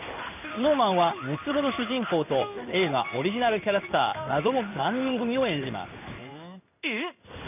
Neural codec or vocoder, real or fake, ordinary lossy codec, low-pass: codec, 16 kHz in and 24 kHz out, 1 kbps, XY-Tokenizer; fake; none; 3.6 kHz